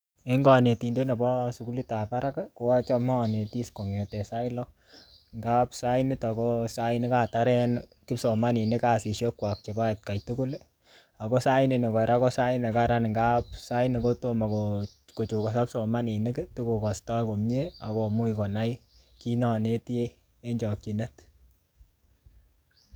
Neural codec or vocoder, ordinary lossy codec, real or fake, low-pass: codec, 44.1 kHz, 7.8 kbps, DAC; none; fake; none